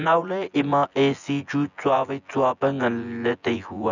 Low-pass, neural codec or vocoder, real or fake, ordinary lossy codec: 7.2 kHz; vocoder, 24 kHz, 100 mel bands, Vocos; fake; none